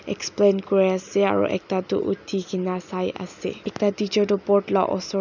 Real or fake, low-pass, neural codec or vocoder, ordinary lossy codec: real; 7.2 kHz; none; none